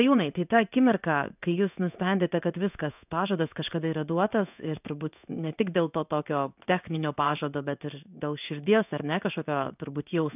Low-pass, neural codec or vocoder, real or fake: 3.6 kHz; codec, 16 kHz in and 24 kHz out, 1 kbps, XY-Tokenizer; fake